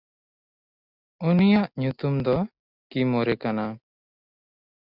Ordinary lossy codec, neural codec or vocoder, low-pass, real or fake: Opus, 64 kbps; none; 5.4 kHz; real